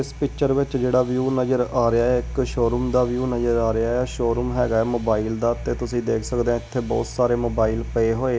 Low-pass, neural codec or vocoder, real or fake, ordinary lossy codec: none; none; real; none